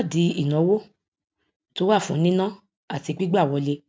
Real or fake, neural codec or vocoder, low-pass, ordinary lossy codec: real; none; none; none